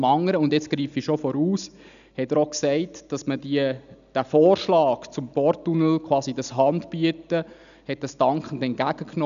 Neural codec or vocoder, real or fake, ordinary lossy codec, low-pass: none; real; none; 7.2 kHz